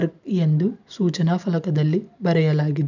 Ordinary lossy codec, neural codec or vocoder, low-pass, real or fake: none; none; 7.2 kHz; real